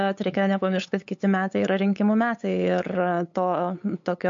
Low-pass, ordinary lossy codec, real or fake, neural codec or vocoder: 7.2 kHz; MP3, 48 kbps; fake; codec, 16 kHz, 8 kbps, FunCodec, trained on LibriTTS, 25 frames a second